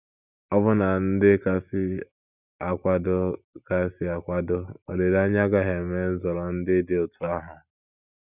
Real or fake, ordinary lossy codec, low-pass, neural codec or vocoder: real; none; 3.6 kHz; none